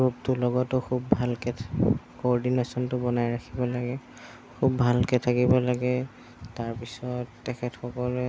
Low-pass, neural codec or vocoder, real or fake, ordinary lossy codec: none; none; real; none